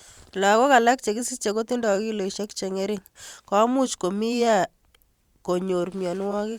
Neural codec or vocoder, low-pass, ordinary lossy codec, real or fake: vocoder, 44.1 kHz, 128 mel bands every 512 samples, BigVGAN v2; 19.8 kHz; Opus, 64 kbps; fake